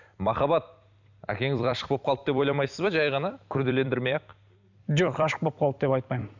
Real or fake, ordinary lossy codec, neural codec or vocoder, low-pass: fake; none; vocoder, 44.1 kHz, 128 mel bands every 256 samples, BigVGAN v2; 7.2 kHz